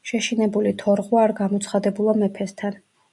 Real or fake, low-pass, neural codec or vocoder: real; 10.8 kHz; none